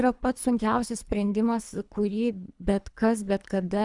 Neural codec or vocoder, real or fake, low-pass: codec, 24 kHz, 3 kbps, HILCodec; fake; 10.8 kHz